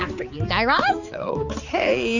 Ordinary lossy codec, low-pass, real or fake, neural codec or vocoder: Opus, 64 kbps; 7.2 kHz; fake; codec, 16 kHz, 4 kbps, X-Codec, HuBERT features, trained on balanced general audio